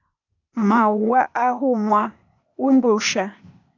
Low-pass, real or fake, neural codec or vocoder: 7.2 kHz; fake; codec, 16 kHz, 0.8 kbps, ZipCodec